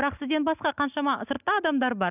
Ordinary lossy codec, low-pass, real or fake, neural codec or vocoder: none; 3.6 kHz; real; none